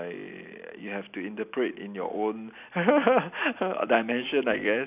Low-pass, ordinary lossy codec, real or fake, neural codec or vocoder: 3.6 kHz; none; real; none